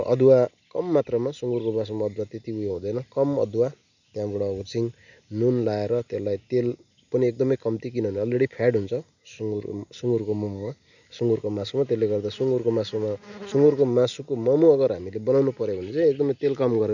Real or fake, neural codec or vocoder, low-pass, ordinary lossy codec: real; none; 7.2 kHz; none